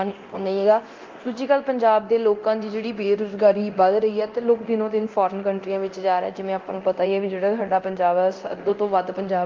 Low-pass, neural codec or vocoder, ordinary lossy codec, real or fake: 7.2 kHz; codec, 24 kHz, 0.9 kbps, DualCodec; Opus, 24 kbps; fake